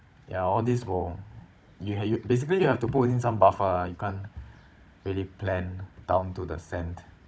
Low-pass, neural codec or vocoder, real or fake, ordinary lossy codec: none; codec, 16 kHz, 16 kbps, FunCodec, trained on Chinese and English, 50 frames a second; fake; none